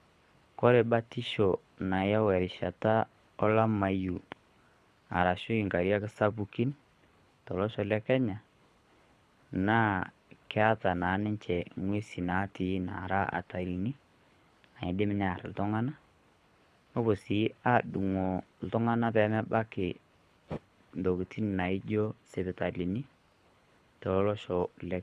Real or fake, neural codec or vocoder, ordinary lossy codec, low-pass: fake; codec, 24 kHz, 6 kbps, HILCodec; none; none